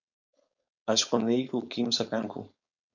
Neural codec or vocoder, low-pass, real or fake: codec, 16 kHz, 4.8 kbps, FACodec; 7.2 kHz; fake